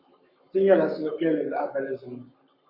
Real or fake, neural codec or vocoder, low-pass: fake; codec, 44.1 kHz, 7.8 kbps, Pupu-Codec; 5.4 kHz